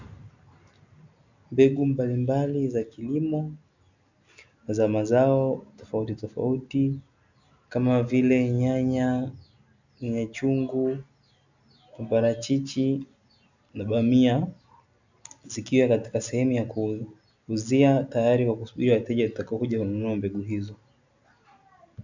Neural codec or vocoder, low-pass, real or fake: none; 7.2 kHz; real